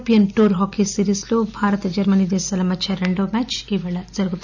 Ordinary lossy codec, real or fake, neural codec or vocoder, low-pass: none; real; none; 7.2 kHz